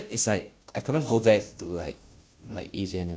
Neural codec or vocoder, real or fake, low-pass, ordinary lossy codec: codec, 16 kHz, 0.5 kbps, FunCodec, trained on Chinese and English, 25 frames a second; fake; none; none